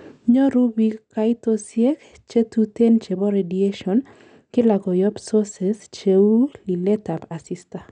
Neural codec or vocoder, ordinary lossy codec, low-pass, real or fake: none; none; 9.9 kHz; real